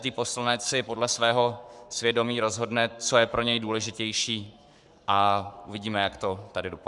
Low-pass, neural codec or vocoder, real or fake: 10.8 kHz; codec, 44.1 kHz, 7.8 kbps, Pupu-Codec; fake